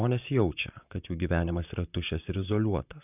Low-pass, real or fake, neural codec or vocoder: 3.6 kHz; fake; vocoder, 44.1 kHz, 128 mel bands, Pupu-Vocoder